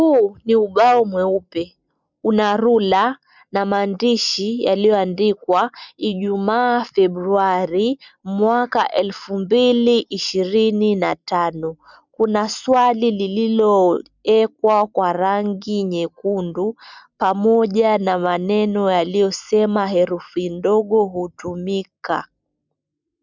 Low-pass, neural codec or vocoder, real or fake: 7.2 kHz; none; real